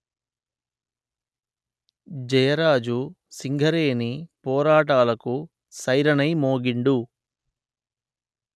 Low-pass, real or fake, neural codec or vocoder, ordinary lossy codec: none; real; none; none